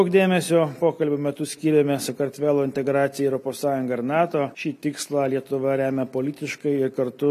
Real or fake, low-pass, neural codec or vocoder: real; 14.4 kHz; none